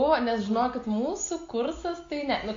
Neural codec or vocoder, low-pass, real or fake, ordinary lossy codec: none; 7.2 kHz; real; AAC, 48 kbps